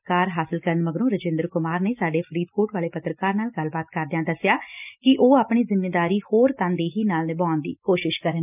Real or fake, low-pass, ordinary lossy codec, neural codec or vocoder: real; 3.6 kHz; none; none